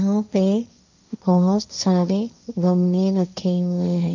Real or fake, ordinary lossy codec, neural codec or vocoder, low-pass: fake; none; codec, 16 kHz, 1.1 kbps, Voila-Tokenizer; 7.2 kHz